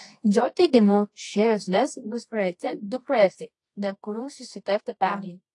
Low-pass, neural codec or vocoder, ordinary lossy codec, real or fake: 10.8 kHz; codec, 24 kHz, 0.9 kbps, WavTokenizer, medium music audio release; AAC, 48 kbps; fake